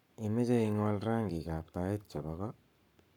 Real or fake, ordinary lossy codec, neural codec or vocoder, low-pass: fake; none; codec, 44.1 kHz, 7.8 kbps, Pupu-Codec; 19.8 kHz